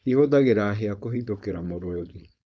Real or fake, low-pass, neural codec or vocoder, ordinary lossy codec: fake; none; codec, 16 kHz, 4.8 kbps, FACodec; none